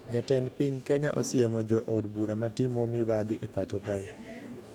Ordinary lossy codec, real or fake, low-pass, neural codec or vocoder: none; fake; 19.8 kHz; codec, 44.1 kHz, 2.6 kbps, DAC